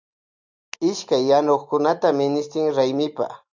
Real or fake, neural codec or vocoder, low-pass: real; none; 7.2 kHz